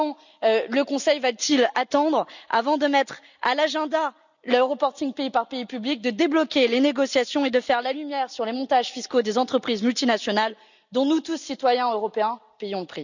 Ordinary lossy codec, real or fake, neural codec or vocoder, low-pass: none; real; none; 7.2 kHz